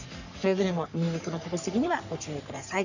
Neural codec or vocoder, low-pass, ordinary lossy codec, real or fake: codec, 44.1 kHz, 3.4 kbps, Pupu-Codec; 7.2 kHz; none; fake